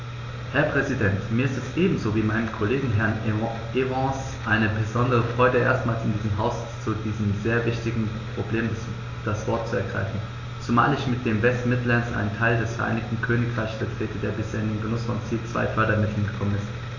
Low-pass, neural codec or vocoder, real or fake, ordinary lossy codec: 7.2 kHz; none; real; AAC, 48 kbps